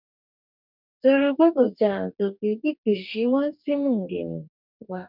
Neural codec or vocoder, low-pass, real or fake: codec, 44.1 kHz, 2.6 kbps, DAC; 5.4 kHz; fake